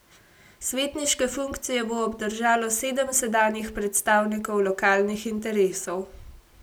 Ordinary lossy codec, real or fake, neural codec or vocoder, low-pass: none; real; none; none